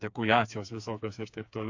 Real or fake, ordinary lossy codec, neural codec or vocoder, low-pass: fake; AAC, 48 kbps; codec, 16 kHz, 4 kbps, FreqCodec, smaller model; 7.2 kHz